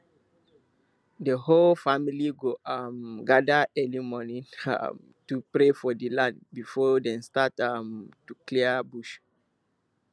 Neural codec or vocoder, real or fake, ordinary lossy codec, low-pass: none; real; none; none